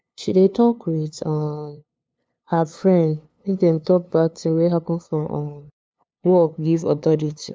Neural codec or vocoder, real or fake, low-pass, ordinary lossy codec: codec, 16 kHz, 2 kbps, FunCodec, trained on LibriTTS, 25 frames a second; fake; none; none